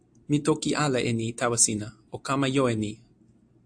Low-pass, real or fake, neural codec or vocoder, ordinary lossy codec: 9.9 kHz; real; none; AAC, 64 kbps